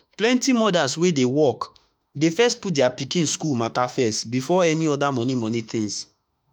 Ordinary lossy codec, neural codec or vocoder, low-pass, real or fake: none; autoencoder, 48 kHz, 32 numbers a frame, DAC-VAE, trained on Japanese speech; none; fake